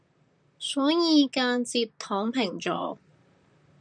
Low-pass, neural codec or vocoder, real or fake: 9.9 kHz; vocoder, 44.1 kHz, 128 mel bands, Pupu-Vocoder; fake